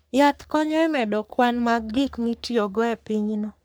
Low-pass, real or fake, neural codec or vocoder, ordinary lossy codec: none; fake; codec, 44.1 kHz, 3.4 kbps, Pupu-Codec; none